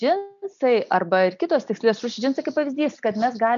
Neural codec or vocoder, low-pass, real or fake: none; 7.2 kHz; real